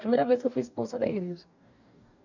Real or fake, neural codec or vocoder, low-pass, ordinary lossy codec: fake; codec, 44.1 kHz, 2.6 kbps, DAC; 7.2 kHz; none